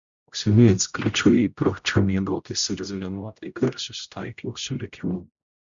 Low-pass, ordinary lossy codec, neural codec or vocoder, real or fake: 7.2 kHz; Opus, 64 kbps; codec, 16 kHz, 0.5 kbps, X-Codec, HuBERT features, trained on general audio; fake